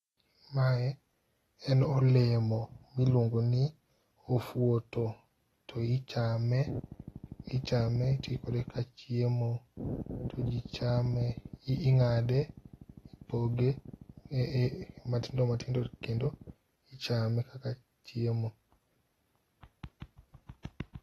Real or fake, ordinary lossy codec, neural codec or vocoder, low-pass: real; AAC, 32 kbps; none; 19.8 kHz